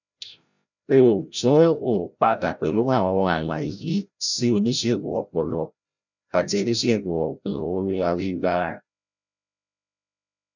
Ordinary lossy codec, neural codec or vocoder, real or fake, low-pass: none; codec, 16 kHz, 0.5 kbps, FreqCodec, larger model; fake; 7.2 kHz